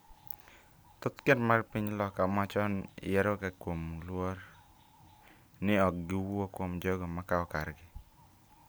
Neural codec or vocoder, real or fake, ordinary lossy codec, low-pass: none; real; none; none